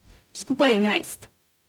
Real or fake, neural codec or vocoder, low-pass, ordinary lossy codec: fake; codec, 44.1 kHz, 0.9 kbps, DAC; 19.8 kHz; none